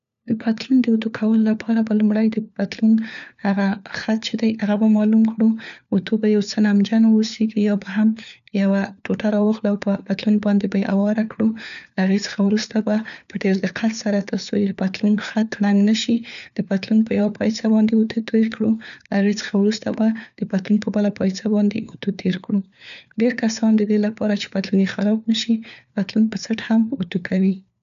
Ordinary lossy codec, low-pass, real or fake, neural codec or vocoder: none; 7.2 kHz; fake; codec, 16 kHz, 4 kbps, FunCodec, trained on LibriTTS, 50 frames a second